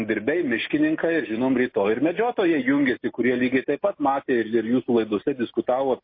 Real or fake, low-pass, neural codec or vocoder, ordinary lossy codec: real; 5.4 kHz; none; MP3, 24 kbps